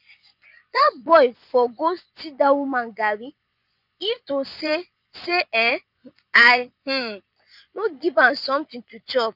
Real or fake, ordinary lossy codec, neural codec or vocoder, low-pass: fake; none; vocoder, 24 kHz, 100 mel bands, Vocos; 5.4 kHz